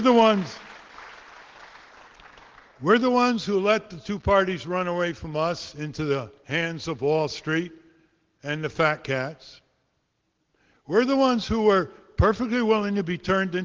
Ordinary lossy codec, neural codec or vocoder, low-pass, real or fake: Opus, 16 kbps; none; 7.2 kHz; real